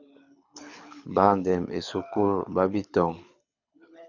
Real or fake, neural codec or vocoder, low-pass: fake; codec, 24 kHz, 6 kbps, HILCodec; 7.2 kHz